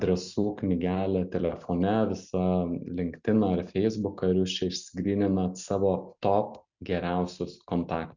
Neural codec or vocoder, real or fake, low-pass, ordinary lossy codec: none; real; 7.2 kHz; Opus, 64 kbps